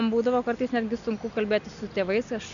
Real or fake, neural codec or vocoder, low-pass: real; none; 7.2 kHz